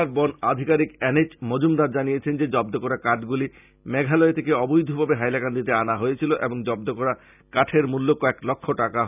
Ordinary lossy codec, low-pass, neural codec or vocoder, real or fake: none; 3.6 kHz; none; real